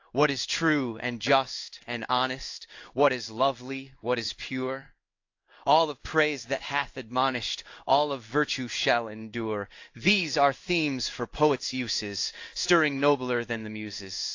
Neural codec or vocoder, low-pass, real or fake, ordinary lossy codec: codec, 16 kHz in and 24 kHz out, 1 kbps, XY-Tokenizer; 7.2 kHz; fake; AAC, 48 kbps